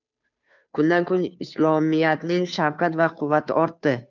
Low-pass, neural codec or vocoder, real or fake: 7.2 kHz; codec, 16 kHz, 2 kbps, FunCodec, trained on Chinese and English, 25 frames a second; fake